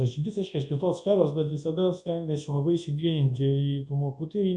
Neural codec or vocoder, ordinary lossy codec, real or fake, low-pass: codec, 24 kHz, 0.9 kbps, WavTokenizer, large speech release; MP3, 64 kbps; fake; 10.8 kHz